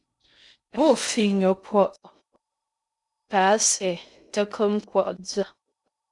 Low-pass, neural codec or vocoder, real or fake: 10.8 kHz; codec, 16 kHz in and 24 kHz out, 0.6 kbps, FocalCodec, streaming, 2048 codes; fake